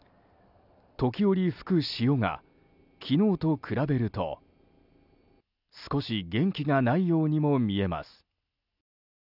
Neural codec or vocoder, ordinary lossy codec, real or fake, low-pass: none; none; real; 5.4 kHz